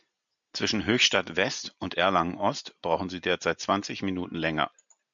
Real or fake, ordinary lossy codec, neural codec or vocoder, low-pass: real; MP3, 96 kbps; none; 7.2 kHz